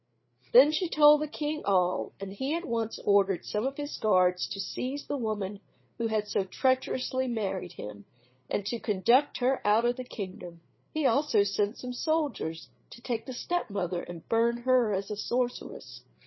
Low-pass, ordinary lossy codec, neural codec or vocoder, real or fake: 7.2 kHz; MP3, 24 kbps; codec, 16 kHz, 8 kbps, FreqCodec, larger model; fake